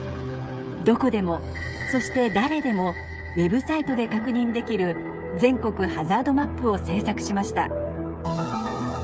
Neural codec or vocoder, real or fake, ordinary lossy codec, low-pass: codec, 16 kHz, 8 kbps, FreqCodec, smaller model; fake; none; none